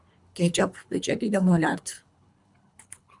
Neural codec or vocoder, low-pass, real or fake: codec, 24 kHz, 3 kbps, HILCodec; 10.8 kHz; fake